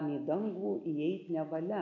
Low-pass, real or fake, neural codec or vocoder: 7.2 kHz; real; none